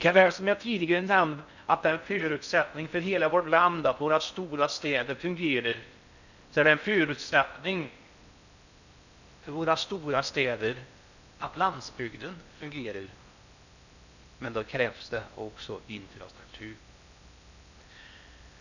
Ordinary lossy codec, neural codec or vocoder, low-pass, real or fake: none; codec, 16 kHz in and 24 kHz out, 0.6 kbps, FocalCodec, streaming, 4096 codes; 7.2 kHz; fake